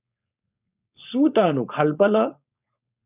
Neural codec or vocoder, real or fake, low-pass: codec, 16 kHz, 4.8 kbps, FACodec; fake; 3.6 kHz